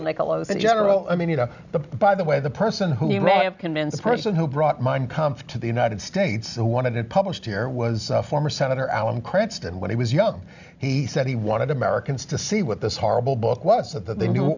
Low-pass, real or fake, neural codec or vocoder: 7.2 kHz; real; none